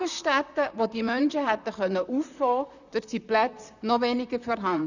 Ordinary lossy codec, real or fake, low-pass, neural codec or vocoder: none; fake; 7.2 kHz; vocoder, 44.1 kHz, 128 mel bands, Pupu-Vocoder